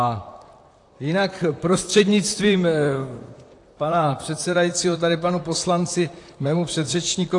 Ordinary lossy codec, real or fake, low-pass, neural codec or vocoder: AAC, 48 kbps; fake; 10.8 kHz; vocoder, 44.1 kHz, 128 mel bands, Pupu-Vocoder